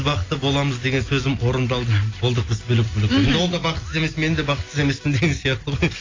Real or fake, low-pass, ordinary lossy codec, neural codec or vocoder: real; 7.2 kHz; AAC, 32 kbps; none